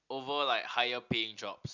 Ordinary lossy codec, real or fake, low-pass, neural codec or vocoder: none; real; 7.2 kHz; none